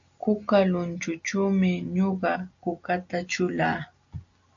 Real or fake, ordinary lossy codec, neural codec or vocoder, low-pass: real; AAC, 64 kbps; none; 7.2 kHz